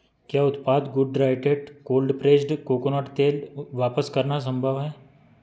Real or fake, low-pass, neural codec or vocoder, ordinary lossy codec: real; none; none; none